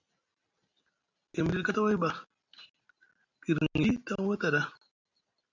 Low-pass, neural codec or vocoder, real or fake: 7.2 kHz; none; real